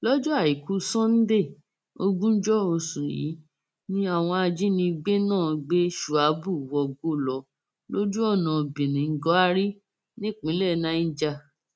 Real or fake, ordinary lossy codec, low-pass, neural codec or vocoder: real; none; none; none